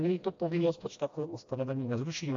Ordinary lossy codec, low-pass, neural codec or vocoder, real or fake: AAC, 64 kbps; 7.2 kHz; codec, 16 kHz, 1 kbps, FreqCodec, smaller model; fake